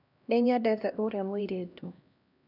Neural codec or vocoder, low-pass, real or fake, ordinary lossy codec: codec, 16 kHz, 1 kbps, X-Codec, HuBERT features, trained on LibriSpeech; 5.4 kHz; fake; none